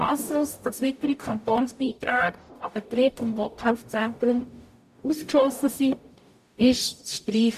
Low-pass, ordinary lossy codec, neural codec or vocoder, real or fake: 14.4 kHz; none; codec, 44.1 kHz, 0.9 kbps, DAC; fake